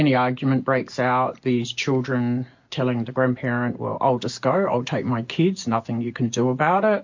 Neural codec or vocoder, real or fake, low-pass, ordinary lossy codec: none; real; 7.2 kHz; MP3, 48 kbps